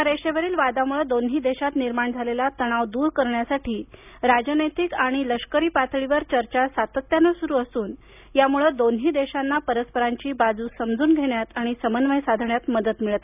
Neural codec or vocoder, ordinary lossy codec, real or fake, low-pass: none; none; real; 3.6 kHz